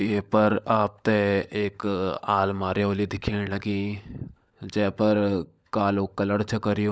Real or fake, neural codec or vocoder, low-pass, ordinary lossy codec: fake; codec, 16 kHz, 16 kbps, FunCodec, trained on LibriTTS, 50 frames a second; none; none